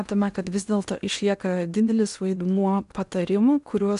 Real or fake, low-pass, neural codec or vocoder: fake; 10.8 kHz; codec, 16 kHz in and 24 kHz out, 0.8 kbps, FocalCodec, streaming, 65536 codes